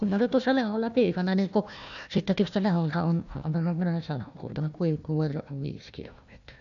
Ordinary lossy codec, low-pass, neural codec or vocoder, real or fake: none; 7.2 kHz; codec, 16 kHz, 1 kbps, FunCodec, trained on Chinese and English, 50 frames a second; fake